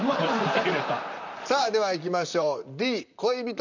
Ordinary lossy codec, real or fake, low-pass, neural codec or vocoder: none; fake; 7.2 kHz; vocoder, 44.1 kHz, 128 mel bands, Pupu-Vocoder